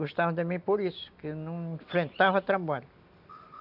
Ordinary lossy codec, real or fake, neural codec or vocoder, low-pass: none; real; none; 5.4 kHz